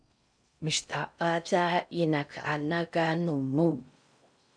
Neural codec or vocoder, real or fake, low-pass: codec, 16 kHz in and 24 kHz out, 0.6 kbps, FocalCodec, streaming, 4096 codes; fake; 9.9 kHz